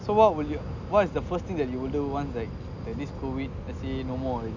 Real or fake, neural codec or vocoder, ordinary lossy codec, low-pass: real; none; none; 7.2 kHz